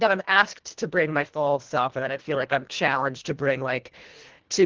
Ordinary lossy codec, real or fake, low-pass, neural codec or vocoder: Opus, 16 kbps; fake; 7.2 kHz; codec, 24 kHz, 1.5 kbps, HILCodec